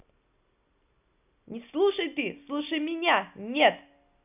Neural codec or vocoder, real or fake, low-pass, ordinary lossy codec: none; real; 3.6 kHz; none